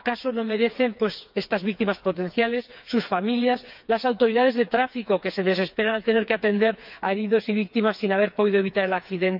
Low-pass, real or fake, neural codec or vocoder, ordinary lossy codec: 5.4 kHz; fake; codec, 16 kHz, 4 kbps, FreqCodec, smaller model; none